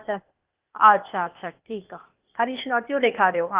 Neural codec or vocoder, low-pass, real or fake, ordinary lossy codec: codec, 16 kHz, 0.8 kbps, ZipCodec; 3.6 kHz; fake; Opus, 32 kbps